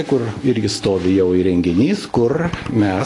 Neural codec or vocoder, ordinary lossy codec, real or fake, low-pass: none; AAC, 32 kbps; real; 10.8 kHz